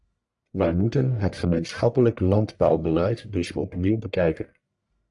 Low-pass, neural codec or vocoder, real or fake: 10.8 kHz; codec, 44.1 kHz, 1.7 kbps, Pupu-Codec; fake